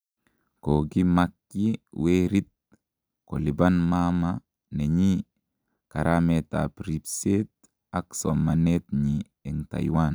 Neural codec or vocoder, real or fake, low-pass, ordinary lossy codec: none; real; none; none